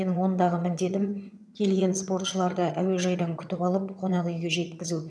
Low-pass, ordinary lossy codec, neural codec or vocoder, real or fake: none; none; vocoder, 22.05 kHz, 80 mel bands, HiFi-GAN; fake